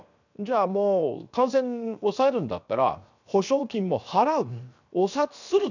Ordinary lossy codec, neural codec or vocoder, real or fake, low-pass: none; codec, 16 kHz, 0.7 kbps, FocalCodec; fake; 7.2 kHz